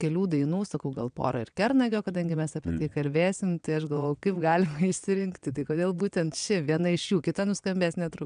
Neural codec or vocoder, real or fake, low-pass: vocoder, 22.05 kHz, 80 mel bands, WaveNeXt; fake; 9.9 kHz